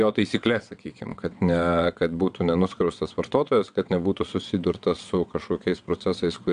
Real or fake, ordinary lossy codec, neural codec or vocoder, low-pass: fake; AAC, 96 kbps; vocoder, 22.05 kHz, 80 mel bands, WaveNeXt; 9.9 kHz